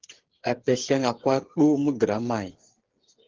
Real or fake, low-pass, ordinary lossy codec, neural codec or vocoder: fake; 7.2 kHz; Opus, 16 kbps; codec, 16 kHz, 8 kbps, FreqCodec, smaller model